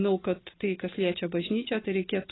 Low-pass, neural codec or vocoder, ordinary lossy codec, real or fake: 7.2 kHz; none; AAC, 16 kbps; real